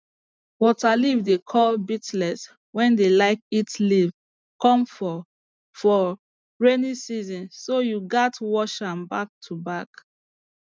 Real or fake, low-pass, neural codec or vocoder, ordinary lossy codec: real; none; none; none